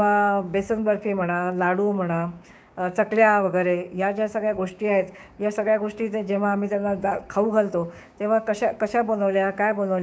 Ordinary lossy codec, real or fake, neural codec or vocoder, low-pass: none; fake; codec, 16 kHz, 6 kbps, DAC; none